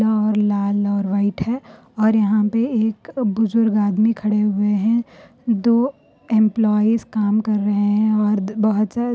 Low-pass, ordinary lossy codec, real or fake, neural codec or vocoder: none; none; real; none